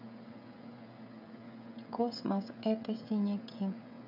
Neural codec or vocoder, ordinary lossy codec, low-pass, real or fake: codec, 16 kHz, 16 kbps, FreqCodec, smaller model; none; 5.4 kHz; fake